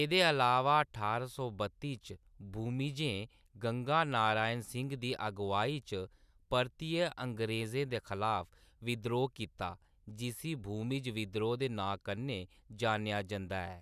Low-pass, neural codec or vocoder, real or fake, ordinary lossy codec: 14.4 kHz; none; real; none